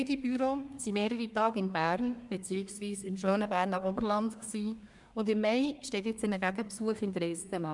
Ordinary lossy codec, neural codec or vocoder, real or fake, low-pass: none; codec, 24 kHz, 1 kbps, SNAC; fake; 10.8 kHz